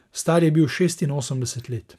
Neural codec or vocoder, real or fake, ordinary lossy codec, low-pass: none; real; none; 14.4 kHz